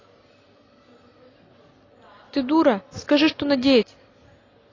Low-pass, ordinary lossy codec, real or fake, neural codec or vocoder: 7.2 kHz; AAC, 32 kbps; real; none